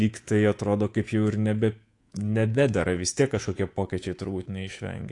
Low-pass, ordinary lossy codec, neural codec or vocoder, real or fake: 10.8 kHz; AAC, 48 kbps; codec, 24 kHz, 3.1 kbps, DualCodec; fake